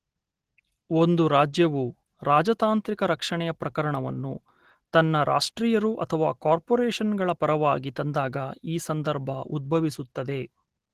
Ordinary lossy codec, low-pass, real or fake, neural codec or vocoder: Opus, 16 kbps; 14.4 kHz; real; none